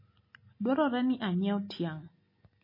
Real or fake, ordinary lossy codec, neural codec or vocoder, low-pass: real; MP3, 24 kbps; none; 5.4 kHz